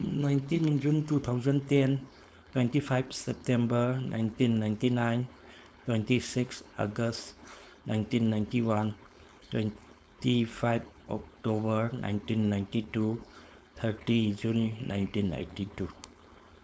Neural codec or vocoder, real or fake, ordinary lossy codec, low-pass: codec, 16 kHz, 4.8 kbps, FACodec; fake; none; none